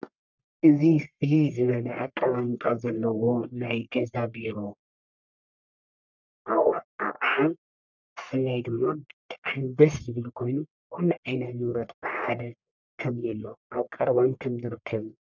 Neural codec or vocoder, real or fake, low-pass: codec, 44.1 kHz, 1.7 kbps, Pupu-Codec; fake; 7.2 kHz